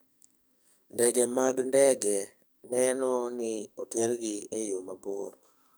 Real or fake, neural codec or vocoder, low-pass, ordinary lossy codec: fake; codec, 44.1 kHz, 2.6 kbps, SNAC; none; none